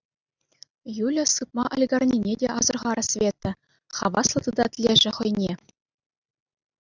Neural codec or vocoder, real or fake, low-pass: none; real; 7.2 kHz